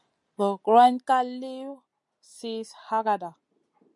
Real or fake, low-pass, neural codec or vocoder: real; 10.8 kHz; none